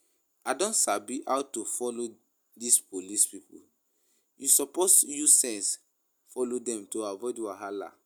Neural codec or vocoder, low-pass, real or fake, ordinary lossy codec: none; none; real; none